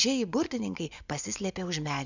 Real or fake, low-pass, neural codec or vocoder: fake; 7.2 kHz; vocoder, 24 kHz, 100 mel bands, Vocos